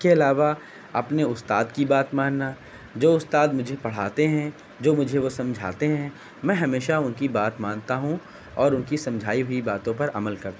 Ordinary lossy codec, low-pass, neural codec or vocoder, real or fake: none; none; none; real